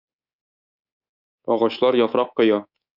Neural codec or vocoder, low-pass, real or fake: codec, 24 kHz, 3.1 kbps, DualCodec; 5.4 kHz; fake